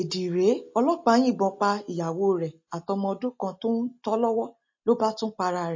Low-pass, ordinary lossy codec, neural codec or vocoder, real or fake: 7.2 kHz; MP3, 32 kbps; none; real